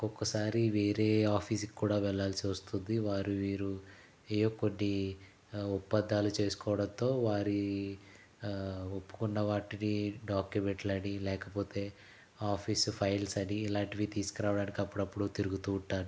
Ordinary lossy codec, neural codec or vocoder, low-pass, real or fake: none; none; none; real